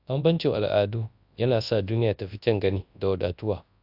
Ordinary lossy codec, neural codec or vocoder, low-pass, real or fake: AAC, 48 kbps; codec, 24 kHz, 0.9 kbps, WavTokenizer, large speech release; 5.4 kHz; fake